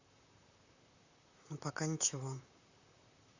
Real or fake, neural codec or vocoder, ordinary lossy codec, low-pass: fake; vocoder, 44.1 kHz, 128 mel bands, Pupu-Vocoder; Opus, 64 kbps; 7.2 kHz